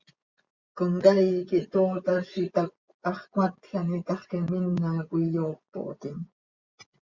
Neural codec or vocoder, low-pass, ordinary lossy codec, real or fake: vocoder, 24 kHz, 100 mel bands, Vocos; 7.2 kHz; Opus, 64 kbps; fake